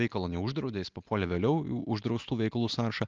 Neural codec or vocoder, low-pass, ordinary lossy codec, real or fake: none; 7.2 kHz; Opus, 24 kbps; real